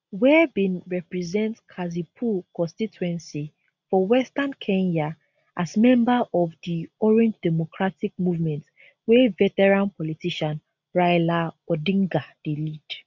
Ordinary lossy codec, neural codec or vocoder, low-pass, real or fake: none; none; 7.2 kHz; real